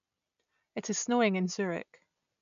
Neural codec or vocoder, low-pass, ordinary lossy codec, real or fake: none; 7.2 kHz; none; real